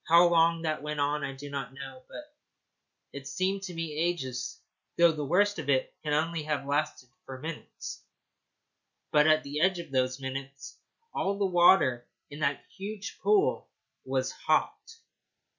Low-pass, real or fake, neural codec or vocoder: 7.2 kHz; real; none